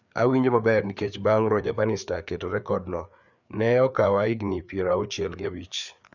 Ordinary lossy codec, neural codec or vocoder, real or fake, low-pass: none; codec, 16 kHz, 4 kbps, FreqCodec, larger model; fake; 7.2 kHz